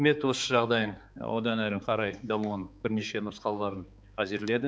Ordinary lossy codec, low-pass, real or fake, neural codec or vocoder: none; none; fake; codec, 16 kHz, 4 kbps, X-Codec, HuBERT features, trained on balanced general audio